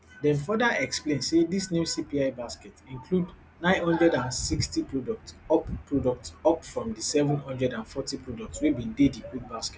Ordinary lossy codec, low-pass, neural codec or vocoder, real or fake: none; none; none; real